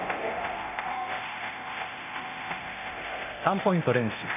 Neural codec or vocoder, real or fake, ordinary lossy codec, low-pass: codec, 24 kHz, 0.9 kbps, DualCodec; fake; none; 3.6 kHz